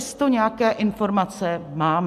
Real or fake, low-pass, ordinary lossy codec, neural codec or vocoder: real; 14.4 kHz; MP3, 96 kbps; none